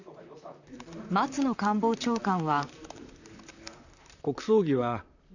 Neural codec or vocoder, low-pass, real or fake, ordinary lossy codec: vocoder, 44.1 kHz, 128 mel bands, Pupu-Vocoder; 7.2 kHz; fake; none